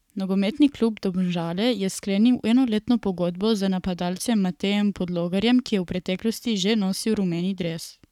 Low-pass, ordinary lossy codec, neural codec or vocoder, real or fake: 19.8 kHz; none; codec, 44.1 kHz, 7.8 kbps, Pupu-Codec; fake